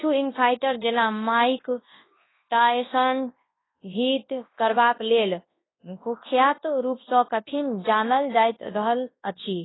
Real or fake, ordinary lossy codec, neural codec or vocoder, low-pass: fake; AAC, 16 kbps; codec, 24 kHz, 0.9 kbps, WavTokenizer, large speech release; 7.2 kHz